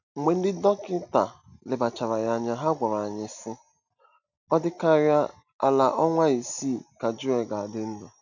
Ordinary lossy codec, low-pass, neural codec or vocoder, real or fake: none; 7.2 kHz; none; real